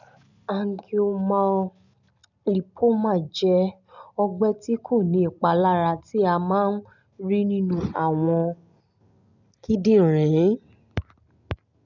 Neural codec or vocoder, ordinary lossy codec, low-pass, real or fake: none; none; 7.2 kHz; real